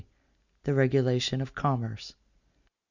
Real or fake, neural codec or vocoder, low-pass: real; none; 7.2 kHz